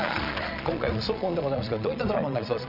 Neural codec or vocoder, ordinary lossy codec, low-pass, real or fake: vocoder, 22.05 kHz, 80 mel bands, Vocos; none; 5.4 kHz; fake